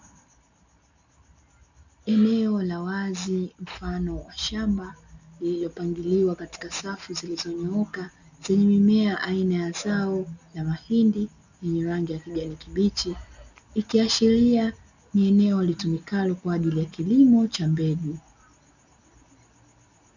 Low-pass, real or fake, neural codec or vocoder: 7.2 kHz; real; none